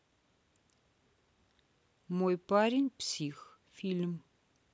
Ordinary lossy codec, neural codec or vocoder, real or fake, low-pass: none; none; real; none